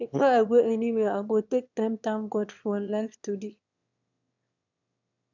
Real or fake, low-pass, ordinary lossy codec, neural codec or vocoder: fake; 7.2 kHz; none; autoencoder, 22.05 kHz, a latent of 192 numbers a frame, VITS, trained on one speaker